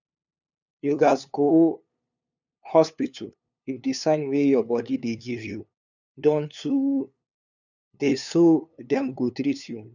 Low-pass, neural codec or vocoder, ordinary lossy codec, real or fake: 7.2 kHz; codec, 16 kHz, 2 kbps, FunCodec, trained on LibriTTS, 25 frames a second; none; fake